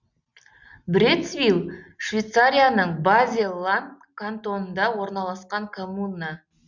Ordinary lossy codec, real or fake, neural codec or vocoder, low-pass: none; real; none; 7.2 kHz